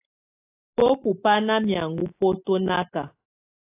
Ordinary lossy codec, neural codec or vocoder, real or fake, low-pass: AAC, 24 kbps; none; real; 3.6 kHz